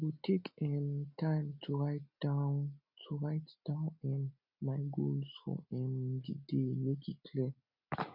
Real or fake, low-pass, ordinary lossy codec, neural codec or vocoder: real; 5.4 kHz; none; none